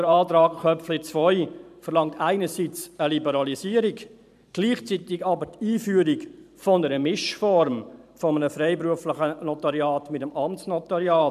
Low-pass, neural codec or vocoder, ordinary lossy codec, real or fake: 14.4 kHz; vocoder, 44.1 kHz, 128 mel bands every 512 samples, BigVGAN v2; none; fake